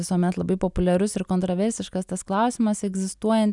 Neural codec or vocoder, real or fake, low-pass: none; real; 10.8 kHz